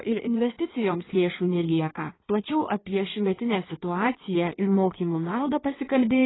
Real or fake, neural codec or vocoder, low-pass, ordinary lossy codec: fake; codec, 16 kHz in and 24 kHz out, 1.1 kbps, FireRedTTS-2 codec; 7.2 kHz; AAC, 16 kbps